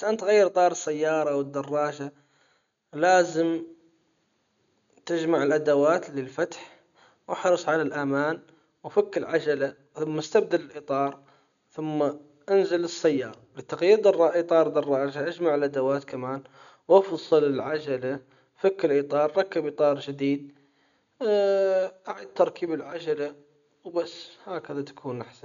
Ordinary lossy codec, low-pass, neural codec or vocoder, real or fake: none; 7.2 kHz; none; real